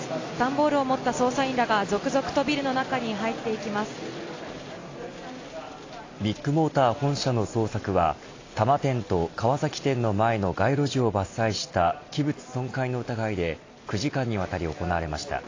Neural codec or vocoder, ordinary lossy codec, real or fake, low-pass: none; AAC, 32 kbps; real; 7.2 kHz